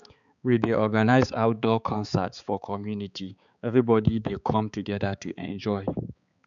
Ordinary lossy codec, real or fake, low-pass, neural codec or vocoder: none; fake; 7.2 kHz; codec, 16 kHz, 4 kbps, X-Codec, HuBERT features, trained on balanced general audio